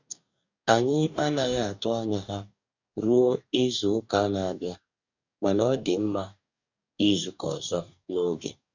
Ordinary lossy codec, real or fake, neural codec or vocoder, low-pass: none; fake; codec, 44.1 kHz, 2.6 kbps, DAC; 7.2 kHz